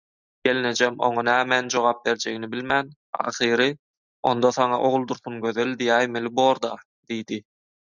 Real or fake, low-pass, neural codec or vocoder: real; 7.2 kHz; none